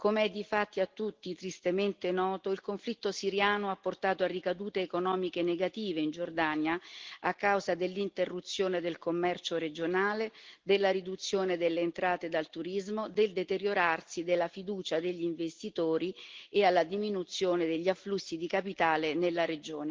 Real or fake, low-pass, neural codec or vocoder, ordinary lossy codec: real; 7.2 kHz; none; Opus, 16 kbps